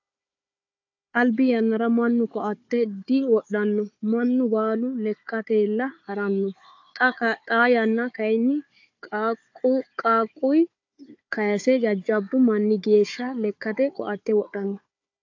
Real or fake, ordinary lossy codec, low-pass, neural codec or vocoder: fake; AAC, 48 kbps; 7.2 kHz; codec, 16 kHz, 4 kbps, FunCodec, trained on Chinese and English, 50 frames a second